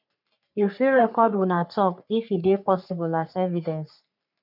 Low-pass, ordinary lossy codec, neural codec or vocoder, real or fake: 5.4 kHz; none; codec, 44.1 kHz, 3.4 kbps, Pupu-Codec; fake